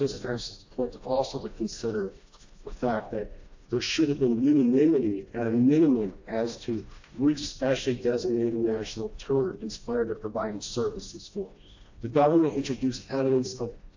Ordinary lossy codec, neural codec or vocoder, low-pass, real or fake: AAC, 48 kbps; codec, 16 kHz, 1 kbps, FreqCodec, smaller model; 7.2 kHz; fake